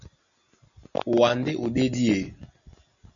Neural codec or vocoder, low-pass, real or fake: none; 7.2 kHz; real